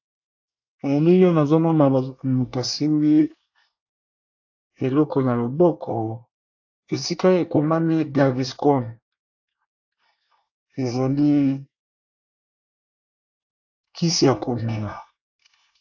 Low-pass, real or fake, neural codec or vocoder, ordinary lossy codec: 7.2 kHz; fake; codec, 24 kHz, 1 kbps, SNAC; AAC, 48 kbps